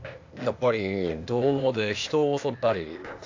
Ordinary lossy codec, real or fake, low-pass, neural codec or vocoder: AAC, 48 kbps; fake; 7.2 kHz; codec, 16 kHz, 0.8 kbps, ZipCodec